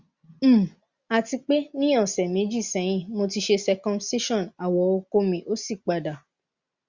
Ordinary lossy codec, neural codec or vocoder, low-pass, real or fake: Opus, 64 kbps; none; 7.2 kHz; real